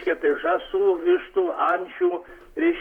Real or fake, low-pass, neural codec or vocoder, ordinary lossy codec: fake; 19.8 kHz; vocoder, 44.1 kHz, 128 mel bands, Pupu-Vocoder; Opus, 64 kbps